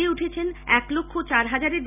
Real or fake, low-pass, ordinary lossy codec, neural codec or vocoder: real; 3.6 kHz; none; none